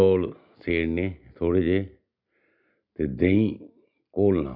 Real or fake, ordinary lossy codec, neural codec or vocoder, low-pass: real; none; none; 5.4 kHz